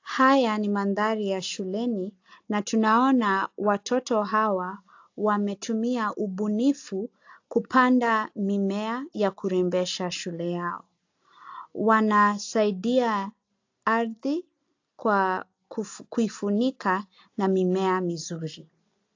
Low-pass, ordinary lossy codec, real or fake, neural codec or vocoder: 7.2 kHz; AAC, 48 kbps; real; none